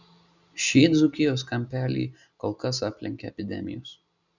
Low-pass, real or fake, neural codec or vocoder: 7.2 kHz; real; none